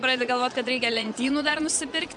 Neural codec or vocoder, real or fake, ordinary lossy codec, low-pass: vocoder, 22.05 kHz, 80 mel bands, Vocos; fake; MP3, 64 kbps; 9.9 kHz